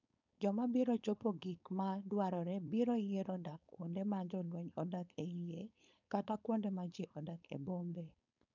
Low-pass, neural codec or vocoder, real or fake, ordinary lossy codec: 7.2 kHz; codec, 16 kHz, 4.8 kbps, FACodec; fake; none